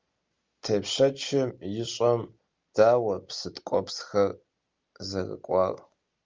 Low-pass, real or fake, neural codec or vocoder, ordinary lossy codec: 7.2 kHz; real; none; Opus, 32 kbps